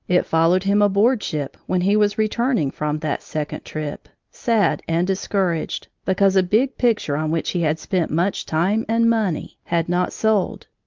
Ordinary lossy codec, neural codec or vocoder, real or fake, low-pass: Opus, 24 kbps; none; real; 7.2 kHz